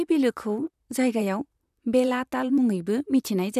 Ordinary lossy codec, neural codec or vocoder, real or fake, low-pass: none; vocoder, 44.1 kHz, 128 mel bands, Pupu-Vocoder; fake; 14.4 kHz